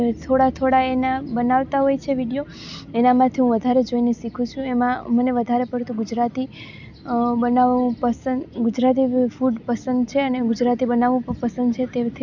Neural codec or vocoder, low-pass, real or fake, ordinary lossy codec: none; 7.2 kHz; real; none